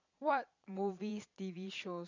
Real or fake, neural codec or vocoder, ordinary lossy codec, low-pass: fake; vocoder, 22.05 kHz, 80 mel bands, Vocos; none; 7.2 kHz